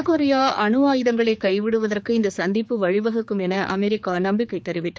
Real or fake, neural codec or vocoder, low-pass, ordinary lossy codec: fake; codec, 16 kHz, 4 kbps, X-Codec, HuBERT features, trained on general audio; none; none